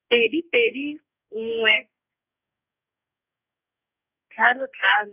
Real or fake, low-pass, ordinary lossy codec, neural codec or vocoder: fake; 3.6 kHz; none; codec, 44.1 kHz, 2.6 kbps, DAC